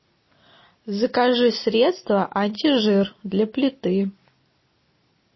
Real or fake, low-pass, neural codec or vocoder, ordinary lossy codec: real; 7.2 kHz; none; MP3, 24 kbps